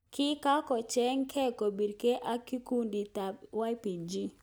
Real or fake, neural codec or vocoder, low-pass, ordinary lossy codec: real; none; none; none